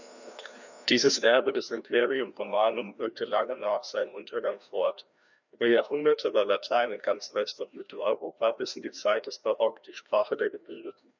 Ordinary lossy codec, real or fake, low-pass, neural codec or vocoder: none; fake; 7.2 kHz; codec, 16 kHz, 1 kbps, FreqCodec, larger model